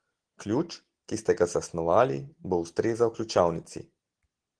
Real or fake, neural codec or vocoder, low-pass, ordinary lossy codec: real; none; 9.9 kHz; Opus, 16 kbps